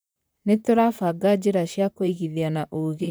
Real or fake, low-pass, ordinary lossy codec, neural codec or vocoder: fake; none; none; vocoder, 44.1 kHz, 128 mel bands, Pupu-Vocoder